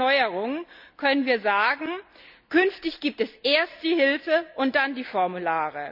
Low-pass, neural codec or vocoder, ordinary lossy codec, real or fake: 5.4 kHz; none; none; real